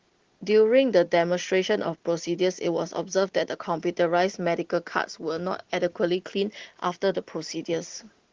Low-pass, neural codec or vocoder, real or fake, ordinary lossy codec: 7.2 kHz; none; real; Opus, 16 kbps